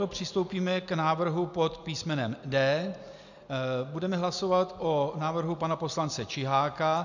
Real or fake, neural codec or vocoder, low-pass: real; none; 7.2 kHz